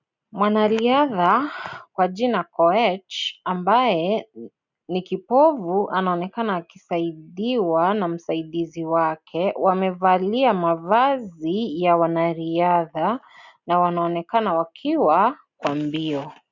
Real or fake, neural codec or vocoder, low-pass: real; none; 7.2 kHz